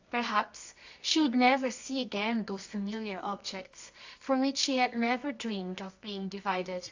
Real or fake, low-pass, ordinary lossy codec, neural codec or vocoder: fake; 7.2 kHz; AAC, 48 kbps; codec, 24 kHz, 0.9 kbps, WavTokenizer, medium music audio release